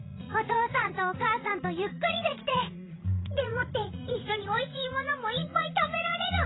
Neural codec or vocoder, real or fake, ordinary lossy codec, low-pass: vocoder, 44.1 kHz, 80 mel bands, Vocos; fake; AAC, 16 kbps; 7.2 kHz